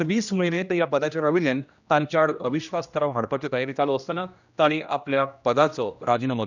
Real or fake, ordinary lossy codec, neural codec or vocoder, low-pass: fake; none; codec, 16 kHz, 1 kbps, X-Codec, HuBERT features, trained on general audio; 7.2 kHz